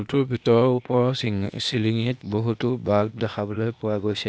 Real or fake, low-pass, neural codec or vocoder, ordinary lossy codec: fake; none; codec, 16 kHz, 0.8 kbps, ZipCodec; none